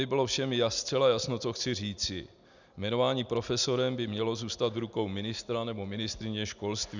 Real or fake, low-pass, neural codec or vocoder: real; 7.2 kHz; none